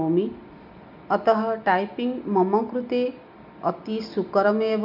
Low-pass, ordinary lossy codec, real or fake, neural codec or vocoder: 5.4 kHz; none; real; none